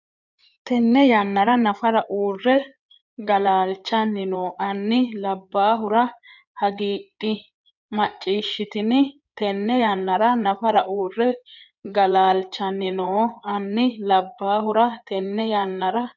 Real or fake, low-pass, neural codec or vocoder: fake; 7.2 kHz; codec, 16 kHz in and 24 kHz out, 2.2 kbps, FireRedTTS-2 codec